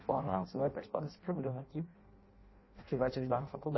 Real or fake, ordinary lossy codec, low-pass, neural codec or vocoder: fake; MP3, 24 kbps; 7.2 kHz; codec, 16 kHz in and 24 kHz out, 0.6 kbps, FireRedTTS-2 codec